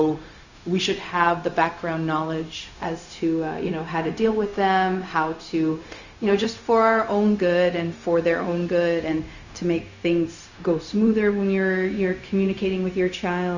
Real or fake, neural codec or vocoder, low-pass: fake; codec, 16 kHz, 0.4 kbps, LongCat-Audio-Codec; 7.2 kHz